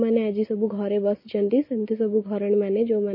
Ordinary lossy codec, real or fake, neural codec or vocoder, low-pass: MP3, 24 kbps; real; none; 5.4 kHz